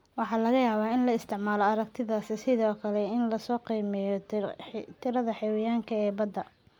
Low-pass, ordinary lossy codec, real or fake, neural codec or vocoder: 19.8 kHz; MP3, 96 kbps; real; none